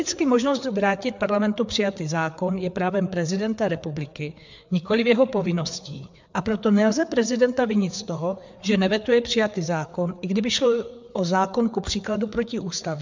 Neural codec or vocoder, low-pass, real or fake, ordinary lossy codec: codec, 16 kHz, 4 kbps, FreqCodec, larger model; 7.2 kHz; fake; MP3, 64 kbps